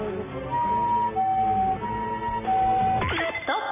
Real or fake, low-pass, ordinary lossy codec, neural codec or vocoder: real; 3.6 kHz; none; none